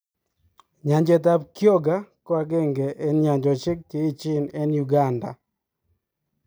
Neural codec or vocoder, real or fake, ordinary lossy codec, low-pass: none; real; none; none